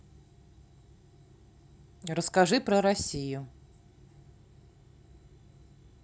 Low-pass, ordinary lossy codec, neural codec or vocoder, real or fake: none; none; none; real